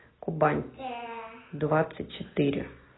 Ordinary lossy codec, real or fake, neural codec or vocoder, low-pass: AAC, 16 kbps; real; none; 7.2 kHz